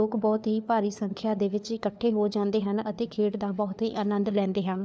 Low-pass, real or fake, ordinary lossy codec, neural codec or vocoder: none; fake; none; codec, 16 kHz, 4 kbps, FunCodec, trained on LibriTTS, 50 frames a second